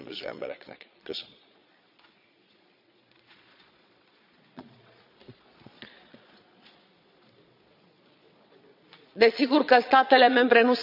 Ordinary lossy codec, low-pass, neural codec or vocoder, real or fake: none; 5.4 kHz; vocoder, 22.05 kHz, 80 mel bands, Vocos; fake